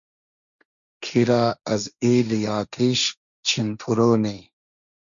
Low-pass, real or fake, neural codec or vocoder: 7.2 kHz; fake; codec, 16 kHz, 1.1 kbps, Voila-Tokenizer